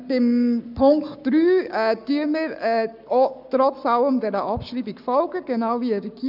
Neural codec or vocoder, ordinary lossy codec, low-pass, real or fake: codec, 44.1 kHz, 7.8 kbps, Pupu-Codec; none; 5.4 kHz; fake